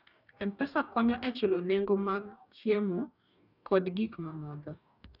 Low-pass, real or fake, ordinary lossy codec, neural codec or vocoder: 5.4 kHz; fake; none; codec, 44.1 kHz, 2.6 kbps, DAC